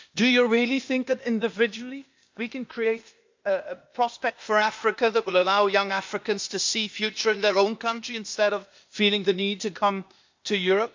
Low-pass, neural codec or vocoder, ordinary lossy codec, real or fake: 7.2 kHz; codec, 16 kHz, 0.8 kbps, ZipCodec; MP3, 64 kbps; fake